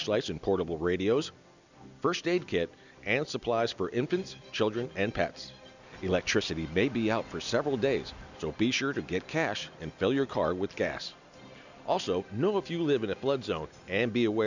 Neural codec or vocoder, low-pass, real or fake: none; 7.2 kHz; real